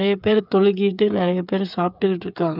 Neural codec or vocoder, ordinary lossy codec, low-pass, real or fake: codec, 16 kHz, 8 kbps, FreqCodec, smaller model; none; 5.4 kHz; fake